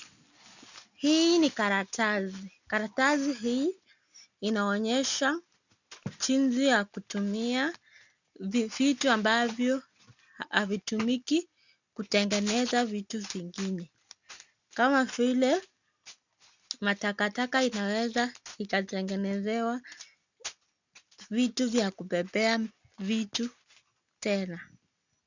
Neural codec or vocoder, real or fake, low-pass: none; real; 7.2 kHz